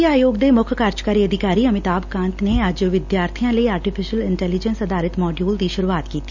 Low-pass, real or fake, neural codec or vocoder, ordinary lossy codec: 7.2 kHz; real; none; none